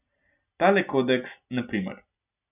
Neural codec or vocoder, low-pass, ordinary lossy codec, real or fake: none; 3.6 kHz; none; real